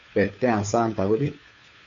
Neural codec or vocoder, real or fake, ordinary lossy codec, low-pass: codec, 16 kHz, 2 kbps, FunCodec, trained on Chinese and English, 25 frames a second; fake; MP3, 48 kbps; 7.2 kHz